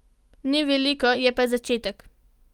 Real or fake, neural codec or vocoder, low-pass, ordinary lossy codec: real; none; 19.8 kHz; Opus, 32 kbps